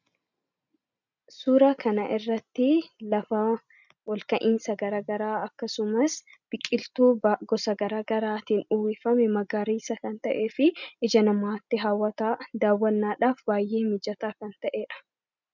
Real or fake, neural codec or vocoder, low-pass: real; none; 7.2 kHz